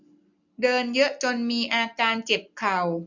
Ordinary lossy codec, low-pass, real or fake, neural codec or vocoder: none; 7.2 kHz; real; none